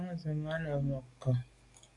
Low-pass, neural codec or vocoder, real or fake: 10.8 kHz; codec, 44.1 kHz, 7.8 kbps, DAC; fake